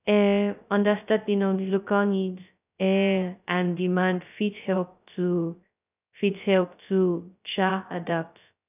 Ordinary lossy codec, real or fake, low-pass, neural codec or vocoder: none; fake; 3.6 kHz; codec, 16 kHz, 0.2 kbps, FocalCodec